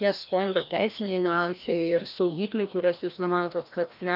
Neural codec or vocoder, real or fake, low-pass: codec, 16 kHz, 1 kbps, FreqCodec, larger model; fake; 5.4 kHz